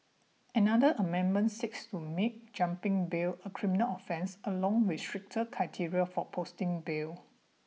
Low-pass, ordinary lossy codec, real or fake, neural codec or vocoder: none; none; real; none